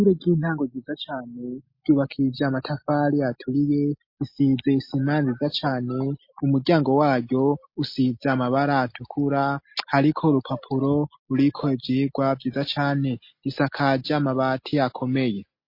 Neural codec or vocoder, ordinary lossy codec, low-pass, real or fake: none; MP3, 32 kbps; 5.4 kHz; real